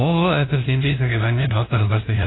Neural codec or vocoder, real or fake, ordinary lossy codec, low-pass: codec, 16 kHz, 0.5 kbps, FunCodec, trained on LibriTTS, 25 frames a second; fake; AAC, 16 kbps; 7.2 kHz